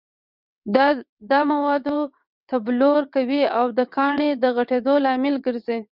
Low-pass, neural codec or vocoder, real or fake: 5.4 kHz; vocoder, 22.05 kHz, 80 mel bands, WaveNeXt; fake